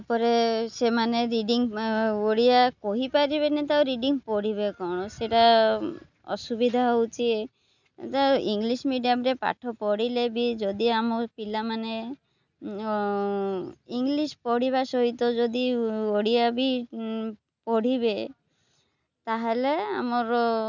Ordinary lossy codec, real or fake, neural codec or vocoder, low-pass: none; real; none; 7.2 kHz